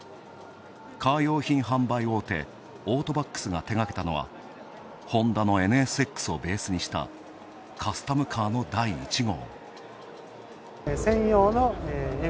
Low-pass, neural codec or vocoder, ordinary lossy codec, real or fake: none; none; none; real